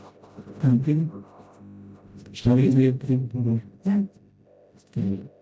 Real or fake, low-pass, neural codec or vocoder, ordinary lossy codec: fake; none; codec, 16 kHz, 0.5 kbps, FreqCodec, smaller model; none